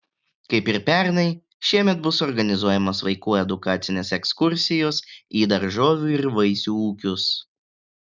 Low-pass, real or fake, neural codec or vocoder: 7.2 kHz; real; none